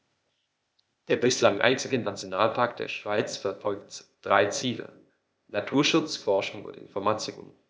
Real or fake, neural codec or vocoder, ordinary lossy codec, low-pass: fake; codec, 16 kHz, 0.8 kbps, ZipCodec; none; none